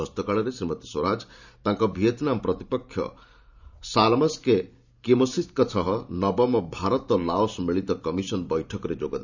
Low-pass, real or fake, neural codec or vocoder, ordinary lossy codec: 7.2 kHz; fake; vocoder, 44.1 kHz, 128 mel bands every 512 samples, BigVGAN v2; none